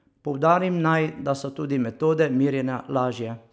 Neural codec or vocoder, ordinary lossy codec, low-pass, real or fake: none; none; none; real